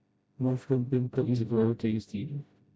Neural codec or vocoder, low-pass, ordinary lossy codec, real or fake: codec, 16 kHz, 0.5 kbps, FreqCodec, smaller model; none; none; fake